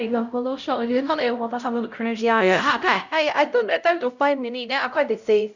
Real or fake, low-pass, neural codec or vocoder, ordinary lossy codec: fake; 7.2 kHz; codec, 16 kHz, 0.5 kbps, X-Codec, HuBERT features, trained on LibriSpeech; none